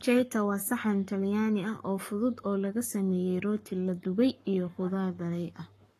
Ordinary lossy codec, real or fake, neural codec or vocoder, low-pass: AAC, 48 kbps; fake; codec, 44.1 kHz, 7.8 kbps, Pupu-Codec; 14.4 kHz